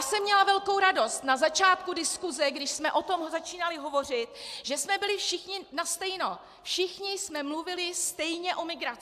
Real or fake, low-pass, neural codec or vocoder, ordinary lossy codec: real; 14.4 kHz; none; AAC, 96 kbps